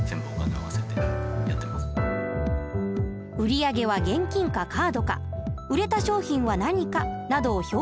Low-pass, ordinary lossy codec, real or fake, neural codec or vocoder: none; none; real; none